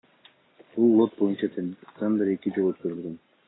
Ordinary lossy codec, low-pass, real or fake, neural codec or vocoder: AAC, 16 kbps; 7.2 kHz; real; none